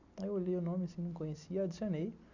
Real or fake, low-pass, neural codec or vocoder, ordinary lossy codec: real; 7.2 kHz; none; none